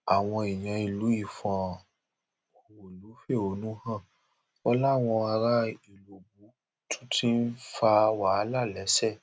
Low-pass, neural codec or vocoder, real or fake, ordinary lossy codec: none; none; real; none